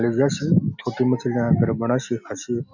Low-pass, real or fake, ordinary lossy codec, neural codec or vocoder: 7.2 kHz; real; none; none